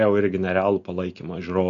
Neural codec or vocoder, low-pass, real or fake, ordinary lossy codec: none; 7.2 kHz; real; MP3, 64 kbps